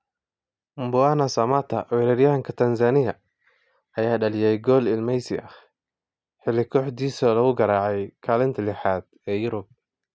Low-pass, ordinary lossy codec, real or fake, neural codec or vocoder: none; none; real; none